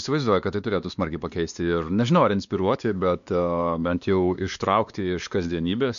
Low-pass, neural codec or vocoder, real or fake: 7.2 kHz; codec, 16 kHz, 2 kbps, X-Codec, WavLM features, trained on Multilingual LibriSpeech; fake